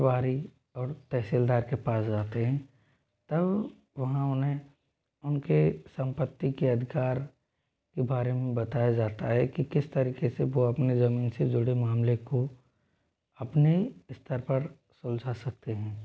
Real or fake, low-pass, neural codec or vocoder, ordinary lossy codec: real; none; none; none